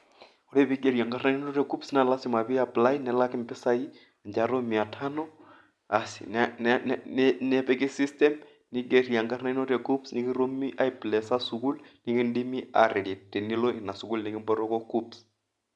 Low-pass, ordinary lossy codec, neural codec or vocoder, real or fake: none; none; none; real